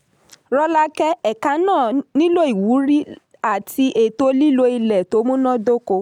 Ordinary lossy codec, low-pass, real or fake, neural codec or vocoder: none; 19.8 kHz; real; none